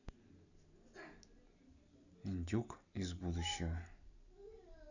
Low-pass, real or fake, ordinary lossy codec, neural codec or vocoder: 7.2 kHz; real; none; none